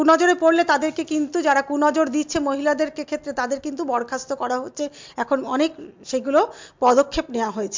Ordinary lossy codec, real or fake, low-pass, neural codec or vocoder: MP3, 64 kbps; real; 7.2 kHz; none